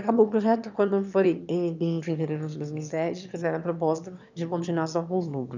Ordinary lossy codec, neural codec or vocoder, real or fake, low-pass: none; autoencoder, 22.05 kHz, a latent of 192 numbers a frame, VITS, trained on one speaker; fake; 7.2 kHz